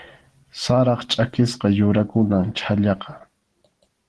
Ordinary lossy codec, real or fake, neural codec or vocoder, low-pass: Opus, 16 kbps; real; none; 10.8 kHz